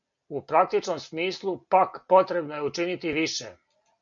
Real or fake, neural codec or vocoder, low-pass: real; none; 7.2 kHz